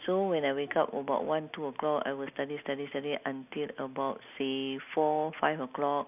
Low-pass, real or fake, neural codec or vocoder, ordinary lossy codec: 3.6 kHz; real; none; none